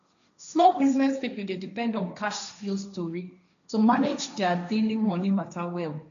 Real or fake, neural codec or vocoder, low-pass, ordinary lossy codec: fake; codec, 16 kHz, 1.1 kbps, Voila-Tokenizer; 7.2 kHz; none